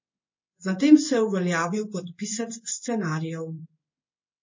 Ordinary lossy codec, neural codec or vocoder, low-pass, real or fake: MP3, 32 kbps; codec, 16 kHz in and 24 kHz out, 1 kbps, XY-Tokenizer; 7.2 kHz; fake